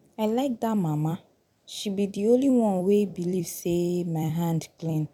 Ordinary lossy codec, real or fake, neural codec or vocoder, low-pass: none; fake; vocoder, 44.1 kHz, 128 mel bands every 256 samples, BigVGAN v2; 19.8 kHz